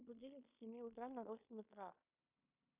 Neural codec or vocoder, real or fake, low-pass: codec, 16 kHz in and 24 kHz out, 0.9 kbps, LongCat-Audio-Codec, fine tuned four codebook decoder; fake; 3.6 kHz